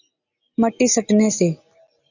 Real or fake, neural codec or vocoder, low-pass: real; none; 7.2 kHz